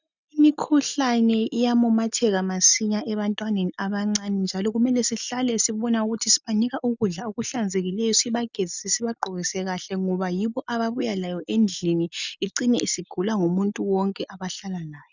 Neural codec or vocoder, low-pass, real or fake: none; 7.2 kHz; real